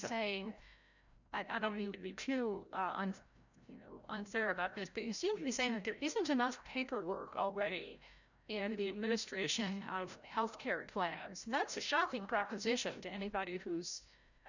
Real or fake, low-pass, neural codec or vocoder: fake; 7.2 kHz; codec, 16 kHz, 0.5 kbps, FreqCodec, larger model